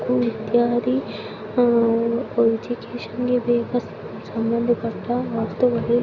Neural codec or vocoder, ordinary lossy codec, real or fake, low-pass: none; Opus, 64 kbps; real; 7.2 kHz